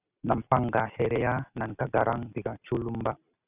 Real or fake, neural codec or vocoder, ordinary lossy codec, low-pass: real; none; Opus, 64 kbps; 3.6 kHz